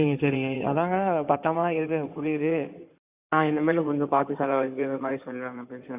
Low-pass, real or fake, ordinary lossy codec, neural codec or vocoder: 3.6 kHz; fake; Opus, 64 kbps; codec, 16 kHz in and 24 kHz out, 2.2 kbps, FireRedTTS-2 codec